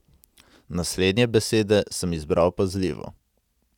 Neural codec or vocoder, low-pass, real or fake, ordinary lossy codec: none; 19.8 kHz; real; none